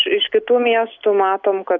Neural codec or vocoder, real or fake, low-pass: none; real; 7.2 kHz